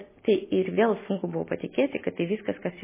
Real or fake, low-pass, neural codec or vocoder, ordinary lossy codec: real; 3.6 kHz; none; MP3, 16 kbps